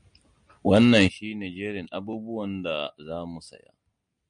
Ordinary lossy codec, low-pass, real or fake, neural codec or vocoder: MP3, 64 kbps; 9.9 kHz; real; none